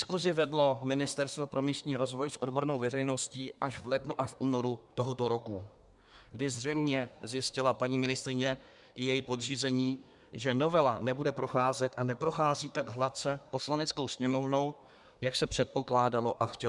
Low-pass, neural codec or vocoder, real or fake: 10.8 kHz; codec, 24 kHz, 1 kbps, SNAC; fake